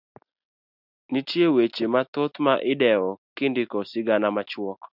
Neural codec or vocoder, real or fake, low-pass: none; real; 5.4 kHz